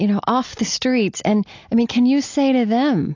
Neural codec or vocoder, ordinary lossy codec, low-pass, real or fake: none; AAC, 48 kbps; 7.2 kHz; real